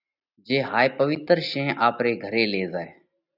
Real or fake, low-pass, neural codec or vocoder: real; 5.4 kHz; none